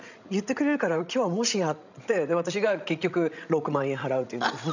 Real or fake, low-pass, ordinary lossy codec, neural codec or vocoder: fake; 7.2 kHz; none; codec, 16 kHz, 16 kbps, FreqCodec, larger model